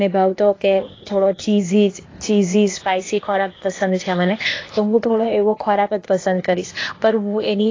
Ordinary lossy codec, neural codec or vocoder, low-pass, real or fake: AAC, 32 kbps; codec, 16 kHz, 0.8 kbps, ZipCodec; 7.2 kHz; fake